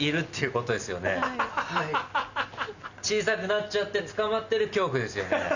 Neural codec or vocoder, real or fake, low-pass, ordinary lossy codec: none; real; 7.2 kHz; none